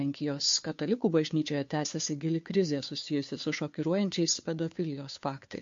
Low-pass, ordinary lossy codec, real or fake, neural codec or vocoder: 7.2 kHz; MP3, 48 kbps; fake; codec, 16 kHz, 2 kbps, FunCodec, trained on Chinese and English, 25 frames a second